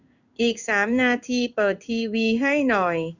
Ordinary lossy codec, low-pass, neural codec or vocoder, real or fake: none; 7.2 kHz; codec, 16 kHz in and 24 kHz out, 1 kbps, XY-Tokenizer; fake